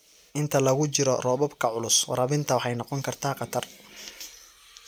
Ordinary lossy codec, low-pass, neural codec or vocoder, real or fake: none; none; none; real